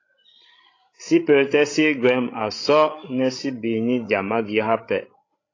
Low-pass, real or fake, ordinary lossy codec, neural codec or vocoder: 7.2 kHz; fake; AAC, 32 kbps; codec, 16 kHz, 16 kbps, FreqCodec, larger model